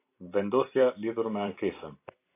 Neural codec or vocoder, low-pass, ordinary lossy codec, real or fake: vocoder, 44.1 kHz, 128 mel bands every 256 samples, BigVGAN v2; 3.6 kHz; AAC, 24 kbps; fake